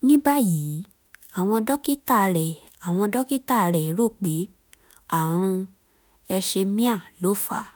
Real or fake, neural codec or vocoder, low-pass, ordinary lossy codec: fake; autoencoder, 48 kHz, 32 numbers a frame, DAC-VAE, trained on Japanese speech; none; none